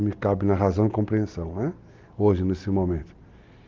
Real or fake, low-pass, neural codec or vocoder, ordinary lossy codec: real; 7.2 kHz; none; Opus, 32 kbps